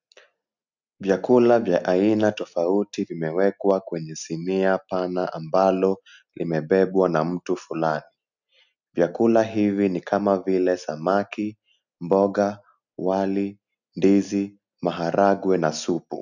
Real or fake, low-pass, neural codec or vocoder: real; 7.2 kHz; none